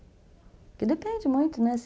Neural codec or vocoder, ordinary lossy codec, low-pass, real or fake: none; none; none; real